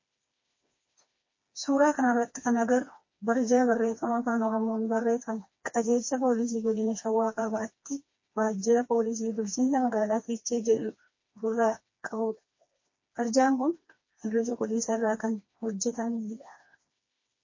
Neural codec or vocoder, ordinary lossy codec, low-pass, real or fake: codec, 16 kHz, 2 kbps, FreqCodec, smaller model; MP3, 32 kbps; 7.2 kHz; fake